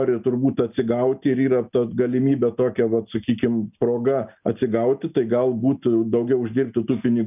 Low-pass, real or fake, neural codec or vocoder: 3.6 kHz; real; none